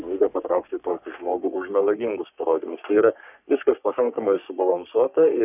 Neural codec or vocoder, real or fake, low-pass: codec, 44.1 kHz, 3.4 kbps, Pupu-Codec; fake; 3.6 kHz